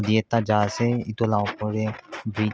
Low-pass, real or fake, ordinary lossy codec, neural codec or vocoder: none; real; none; none